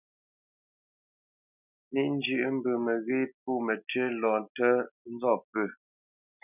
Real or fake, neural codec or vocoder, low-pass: real; none; 3.6 kHz